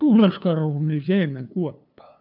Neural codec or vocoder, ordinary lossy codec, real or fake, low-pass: codec, 16 kHz, 2 kbps, FunCodec, trained on LibriTTS, 25 frames a second; none; fake; 5.4 kHz